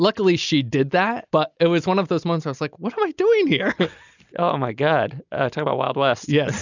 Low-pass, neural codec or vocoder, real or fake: 7.2 kHz; none; real